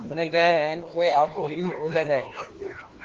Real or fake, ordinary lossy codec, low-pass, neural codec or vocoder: fake; Opus, 16 kbps; 7.2 kHz; codec, 16 kHz, 1 kbps, FunCodec, trained on LibriTTS, 50 frames a second